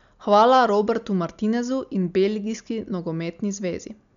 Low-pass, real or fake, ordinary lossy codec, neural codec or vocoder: 7.2 kHz; real; MP3, 96 kbps; none